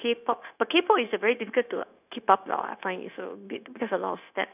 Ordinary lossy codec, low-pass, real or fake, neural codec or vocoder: none; 3.6 kHz; fake; codec, 16 kHz, 0.9 kbps, LongCat-Audio-Codec